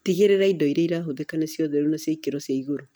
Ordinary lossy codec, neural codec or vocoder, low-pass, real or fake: none; none; none; real